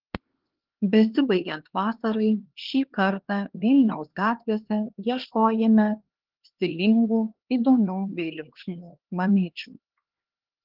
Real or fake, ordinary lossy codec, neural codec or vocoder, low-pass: fake; Opus, 16 kbps; codec, 16 kHz, 2 kbps, X-Codec, HuBERT features, trained on LibriSpeech; 5.4 kHz